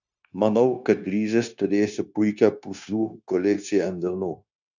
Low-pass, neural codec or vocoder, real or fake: 7.2 kHz; codec, 16 kHz, 0.9 kbps, LongCat-Audio-Codec; fake